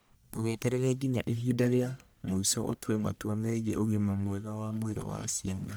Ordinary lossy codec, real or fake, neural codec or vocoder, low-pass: none; fake; codec, 44.1 kHz, 1.7 kbps, Pupu-Codec; none